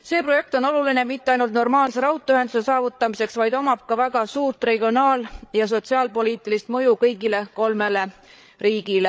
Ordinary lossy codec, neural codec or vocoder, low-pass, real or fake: none; codec, 16 kHz, 8 kbps, FreqCodec, larger model; none; fake